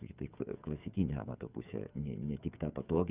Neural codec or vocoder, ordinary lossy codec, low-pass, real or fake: codec, 16 kHz, 8 kbps, FreqCodec, smaller model; Opus, 64 kbps; 3.6 kHz; fake